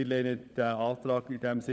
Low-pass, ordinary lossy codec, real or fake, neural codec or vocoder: none; none; fake; codec, 16 kHz, 16 kbps, FunCodec, trained on LibriTTS, 50 frames a second